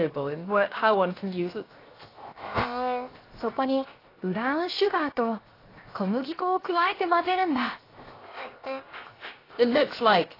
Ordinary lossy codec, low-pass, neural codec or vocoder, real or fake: AAC, 24 kbps; 5.4 kHz; codec, 16 kHz, 0.7 kbps, FocalCodec; fake